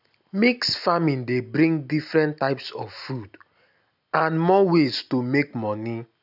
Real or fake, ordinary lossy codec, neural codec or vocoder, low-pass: real; none; none; 5.4 kHz